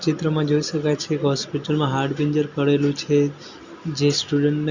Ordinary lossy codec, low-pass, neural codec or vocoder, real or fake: Opus, 64 kbps; 7.2 kHz; none; real